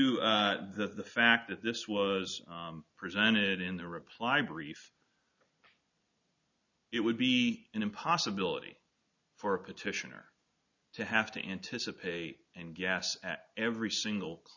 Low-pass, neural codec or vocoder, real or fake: 7.2 kHz; none; real